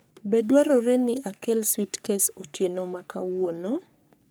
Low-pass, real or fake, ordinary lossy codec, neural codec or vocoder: none; fake; none; codec, 44.1 kHz, 3.4 kbps, Pupu-Codec